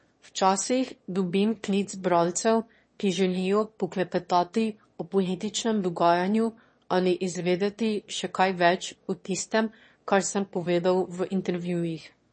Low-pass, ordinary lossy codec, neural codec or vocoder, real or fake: 9.9 kHz; MP3, 32 kbps; autoencoder, 22.05 kHz, a latent of 192 numbers a frame, VITS, trained on one speaker; fake